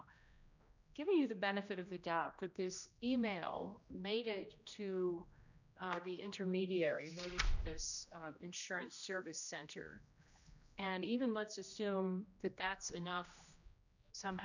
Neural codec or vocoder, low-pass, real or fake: codec, 16 kHz, 1 kbps, X-Codec, HuBERT features, trained on general audio; 7.2 kHz; fake